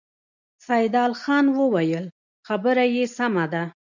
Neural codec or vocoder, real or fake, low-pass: none; real; 7.2 kHz